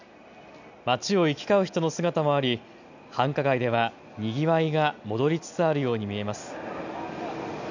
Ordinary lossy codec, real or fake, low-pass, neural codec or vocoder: none; real; 7.2 kHz; none